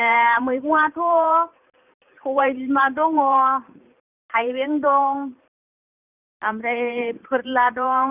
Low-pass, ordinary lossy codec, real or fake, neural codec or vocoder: 3.6 kHz; none; fake; vocoder, 44.1 kHz, 128 mel bands every 512 samples, BigVGAN v2